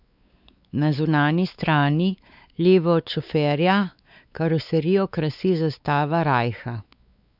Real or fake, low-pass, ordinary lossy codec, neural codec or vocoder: fake; 5.4 kHz; none; codec, 16 kHz, 4 kbps, X-Codec, WavLM features, trained on Multilingual LibriSpeech